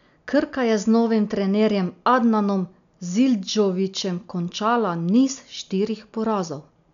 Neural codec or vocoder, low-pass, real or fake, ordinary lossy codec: none; 7.2 kHz; real; none